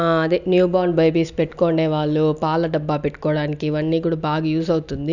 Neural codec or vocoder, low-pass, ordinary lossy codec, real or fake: none; 7.2 kHz; none; real